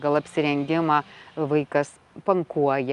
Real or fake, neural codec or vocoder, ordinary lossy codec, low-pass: real; none; Opus, 32 kbps; 10.8 kHz